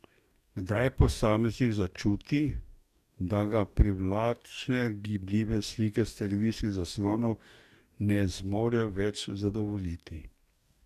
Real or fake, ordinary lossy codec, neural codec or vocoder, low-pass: fake; none; codec, 44.1 kHz, 2.6 kbps, DAC; 14.4 kHz